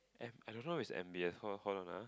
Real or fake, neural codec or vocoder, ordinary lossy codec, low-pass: real; none; none; none